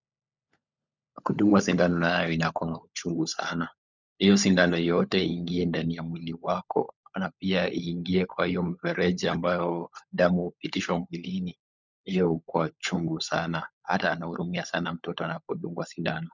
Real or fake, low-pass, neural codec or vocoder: fake; 7.2 kHz; codec, 16 kHz, 16 kbps, FunCodec, trained on LibriTTS, 50 frames a second